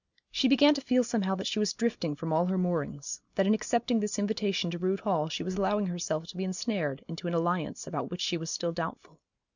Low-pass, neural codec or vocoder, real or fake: 7.2 kHz; none; real